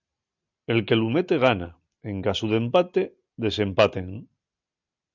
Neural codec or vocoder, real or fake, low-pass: none; real; 7.2 kHz